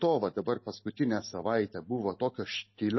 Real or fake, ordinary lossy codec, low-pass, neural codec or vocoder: real; MP3, 24 kbps; 7.2 kHz; none